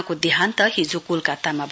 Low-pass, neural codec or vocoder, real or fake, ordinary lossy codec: none; none; real; none